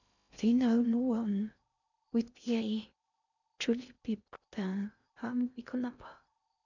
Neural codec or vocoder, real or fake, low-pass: codec, 16 kHz in and 24 kHz out, 0.6 kbps, FocalCodec, streaming, 2048 codes; fake; 7.2 kHz